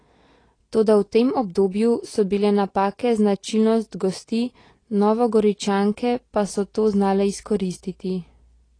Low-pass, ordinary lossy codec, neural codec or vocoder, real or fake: 9.9 kHz; AAC, 32 kbps; none; real